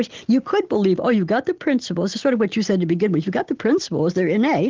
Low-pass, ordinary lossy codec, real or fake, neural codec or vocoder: 7.2 kHz; Opus, 16 kbps; real; none